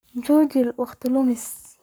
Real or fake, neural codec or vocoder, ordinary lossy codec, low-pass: fake; codec, 44.1 kHz, 7.8 kbps, Pupu-Codec; none; none